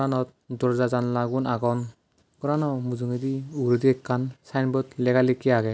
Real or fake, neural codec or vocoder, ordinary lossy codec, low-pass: real; none; none; none